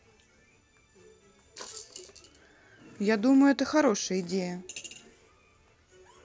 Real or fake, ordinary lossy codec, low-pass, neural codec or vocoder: real; none; none; none